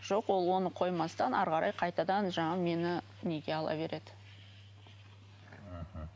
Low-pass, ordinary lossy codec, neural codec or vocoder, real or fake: none; none; none; real